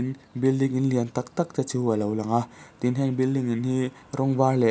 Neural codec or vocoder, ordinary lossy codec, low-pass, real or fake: none; none; none; real